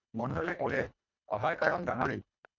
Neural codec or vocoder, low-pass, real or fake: codec, 24 kHz, 1.5 kbps, HILCodec; 7.2 kHz; fake